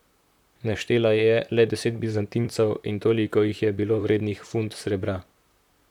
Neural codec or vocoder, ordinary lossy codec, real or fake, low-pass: vocoder, 44.1 kHz, 128 mel bands, Pupu-Vocoder; none; fake; 19.8 kHz